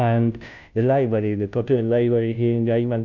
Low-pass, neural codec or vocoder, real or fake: 7.2 kHz; codec, 16 kHz, 0.5 kbps, FunCodec, trained on Chinese and English, 25 frames a second; fake